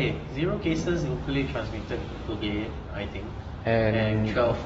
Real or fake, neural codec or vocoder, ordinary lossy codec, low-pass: real; none; AAC, 24 kbps; 14.4 kHz